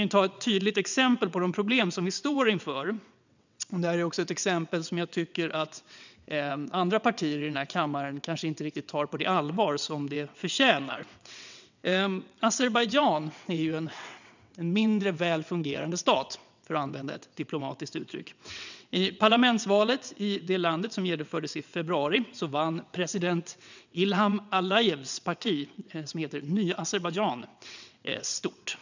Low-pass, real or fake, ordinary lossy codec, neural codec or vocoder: 7.2 kHz; fake; none; vocoder, 22.05 kHz, 80 mel bands, WaveNeXt